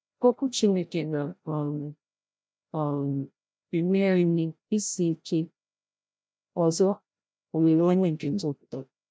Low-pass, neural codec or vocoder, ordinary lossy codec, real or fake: none; codec, 16 kHz, 0.5 kbps, FreqCodec, larger model; none; fake